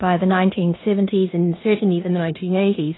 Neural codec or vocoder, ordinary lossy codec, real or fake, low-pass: codec, 16 kHz in and 24 kHz out, 0.9 kbps, LongCat-Audio-Codec, four codebook decoder; AAC, 16 kbps; fake; 7.2 kHz